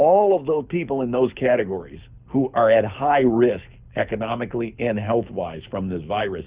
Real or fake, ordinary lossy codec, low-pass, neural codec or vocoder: fake; Opus, 64 kbps; 3.6 kHz; codec, 24 kHz, 6 kbps, HILCodec